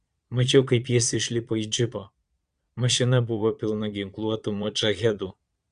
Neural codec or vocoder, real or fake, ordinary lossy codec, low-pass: vocoder, 22.05 kHz, 80 mel bands, Vocos; fake; Opus, 64 kbps; 9.9 kHz